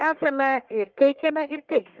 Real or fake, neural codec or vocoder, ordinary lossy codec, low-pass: fake; codec, 44.1 kHz, 1.7 kbps, Pupu-Codec; Opus, 32 kbps; 7.2 kHz